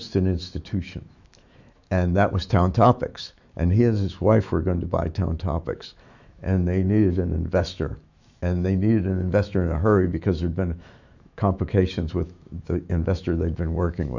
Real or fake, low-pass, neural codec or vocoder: fake; 7.2 kHz; autoencoder, 48 kHz, 128 numbers a frame, DAC-VAE, trained on Japanese speech